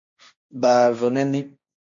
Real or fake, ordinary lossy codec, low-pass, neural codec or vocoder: fake; MP3, 64 kbps; 7.2 kHz; codec, 16 kHz, 1.1 kbps, Voila-Tokenizer